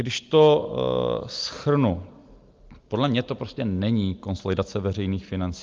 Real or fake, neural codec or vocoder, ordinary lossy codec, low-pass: real; none; Opus, 24 kbps; 7.2 kHz